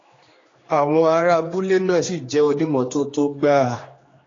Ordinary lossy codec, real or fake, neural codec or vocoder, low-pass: AAC, 32 kbps; fake; codec, 16 kHz, 2 kbps, X-Codec, HuBERT features, trained on general audio; 7.2 kHz